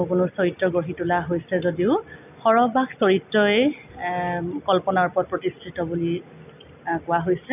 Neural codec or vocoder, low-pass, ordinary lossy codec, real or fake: none; 3.6 kHz; none; real